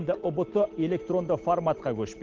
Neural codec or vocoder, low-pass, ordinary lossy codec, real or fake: none; 7.2 kHz; Opus, 16 kbps; real